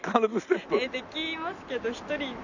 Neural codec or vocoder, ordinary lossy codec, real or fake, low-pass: none; none; real; 7.2 kHz